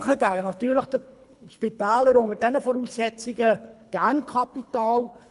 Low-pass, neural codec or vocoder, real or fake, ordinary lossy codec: 10.8 kHz; codec, 24 kHz, 3 kbps, HILCodec; fake; none